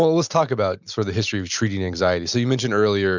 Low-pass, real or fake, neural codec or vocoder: 7.2 kHz; real; none